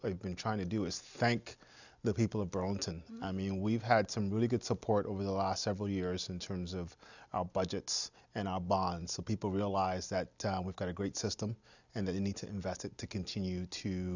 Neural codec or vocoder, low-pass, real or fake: none; 7.2 kHz; real